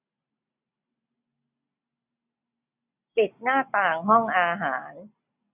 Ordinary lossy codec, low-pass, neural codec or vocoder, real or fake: none; 3.6 kHz; none; real